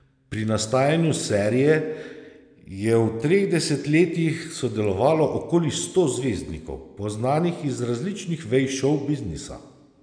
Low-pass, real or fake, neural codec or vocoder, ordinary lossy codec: 9.9 kHz; real; none; none